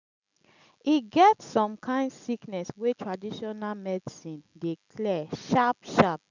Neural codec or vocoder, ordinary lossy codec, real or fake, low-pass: none; none; real; 7.2 kHz